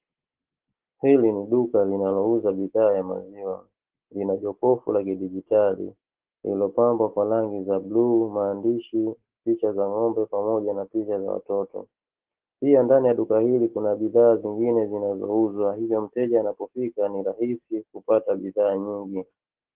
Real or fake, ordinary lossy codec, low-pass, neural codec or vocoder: real; Opus, 16 kbps; 3.6 kHz; none